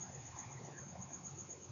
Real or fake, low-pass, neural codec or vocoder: fake; 7.2 kHz; codec, 16 kHz, 4 kbps, X-Codec, WavLM features, trained on Multilingual LibriSpeech